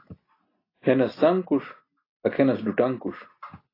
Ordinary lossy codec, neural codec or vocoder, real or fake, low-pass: AAC, 24 kbps; none; real; 5.4 kHz